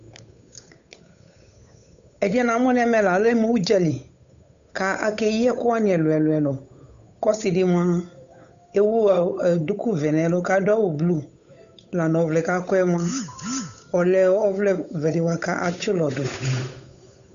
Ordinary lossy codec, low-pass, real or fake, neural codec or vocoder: AAC, 96 kbps; 7.2 kHz; fake; codec, 16 kHz, 8 kbps, FunCodec, trained on Chinese and English, 25 frames a second